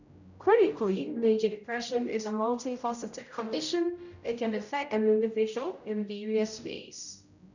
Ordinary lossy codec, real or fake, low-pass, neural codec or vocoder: none; fake; 7.2 kHz; codec, 16 kHz, 0.5 kbps, X-Codec, HuBERT features, trained on general audio